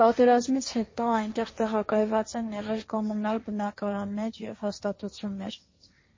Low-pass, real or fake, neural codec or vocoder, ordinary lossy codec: 7.2 kHz; fake; codec, 16 kHz, 1.1 kbps, Voila-Tokenizer; MP3, 32 kbps